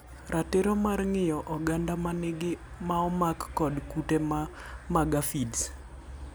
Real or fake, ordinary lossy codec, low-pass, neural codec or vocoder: real; none; none; none